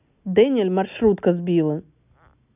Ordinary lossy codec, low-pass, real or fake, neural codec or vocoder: none; 3.6 kHz; real; none